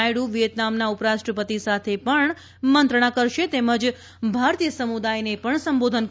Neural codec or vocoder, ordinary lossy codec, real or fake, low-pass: none; none; real; none